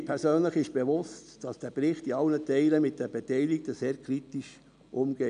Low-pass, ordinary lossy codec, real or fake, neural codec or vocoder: 9.9 kHz; none; real; none